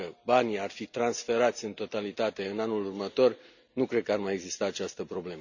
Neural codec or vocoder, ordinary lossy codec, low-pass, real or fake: none; none; 7.2 kHz; real